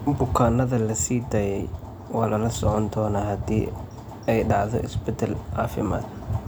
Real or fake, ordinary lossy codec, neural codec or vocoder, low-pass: real; none; none; none